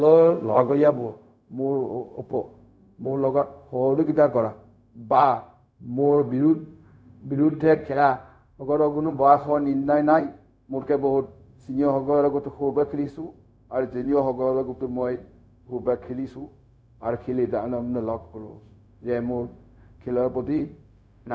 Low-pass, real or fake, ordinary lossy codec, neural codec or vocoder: none; fake; none; codec, 16 kHz, 0.4 kbps, LongCat-Audio-Codec